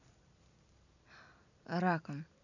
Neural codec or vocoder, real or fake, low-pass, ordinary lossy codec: none; real; 7.2 kHz; none